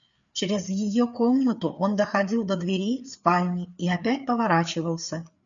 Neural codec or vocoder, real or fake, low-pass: codec, 16 kHz, 4 kbps, FreqCodec, larger model; fake; 7.2 kHz